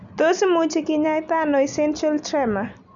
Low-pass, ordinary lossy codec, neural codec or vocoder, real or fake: 7.2 kHz; none; none; real